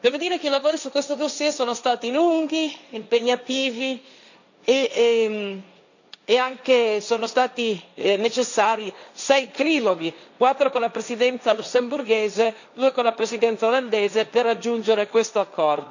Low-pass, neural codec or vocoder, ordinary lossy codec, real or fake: 7.2 kHz; codec, 16 kHz, 1.1 kbps, Voila-Tokenizer; none; fake